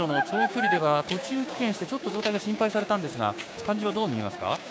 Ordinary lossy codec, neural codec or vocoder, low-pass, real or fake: none; codec, 16 kHz, 6 kbps, DAC; none; fake